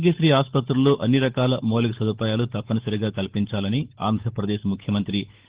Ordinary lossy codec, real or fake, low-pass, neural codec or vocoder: Opus, 16 kbps; fake; 3.6 kHz; codec, 16 kHz, 8 kbps, FunCodec, trained on Chinese and English, 25 frames a second